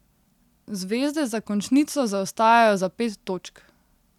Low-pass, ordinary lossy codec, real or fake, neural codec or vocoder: 19.8 kHz; none; real; none